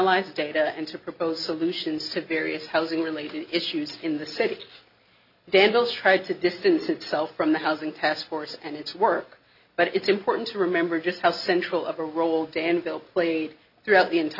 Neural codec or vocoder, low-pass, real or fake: none; 5.4 kHz; real